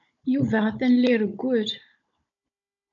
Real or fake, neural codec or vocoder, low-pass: fake; codec, 16 kHz, 16 kbps, FunCodec, trained on Chinese and English, 50 frames a second; 7.2 kHz